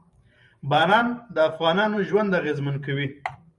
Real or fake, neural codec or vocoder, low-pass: fake; vocoder, 44.1 kHz, 128 mel bands every 512 samples, BigVGAN v2; 10.8 kHz